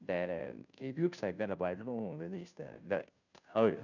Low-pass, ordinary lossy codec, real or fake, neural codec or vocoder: 7.2 kHz; none; fake; codec, 16 kHz, 0.5 kbps, FunCodec, trained on Chinese and English, 25 frames a second